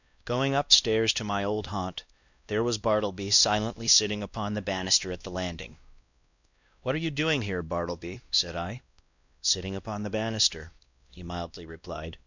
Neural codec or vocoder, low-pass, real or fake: codec, 16 kHz, 1 kbps, X-Codec, WavLM features, trained on Multilingual LibriSpeech; 7.2 kHz; fake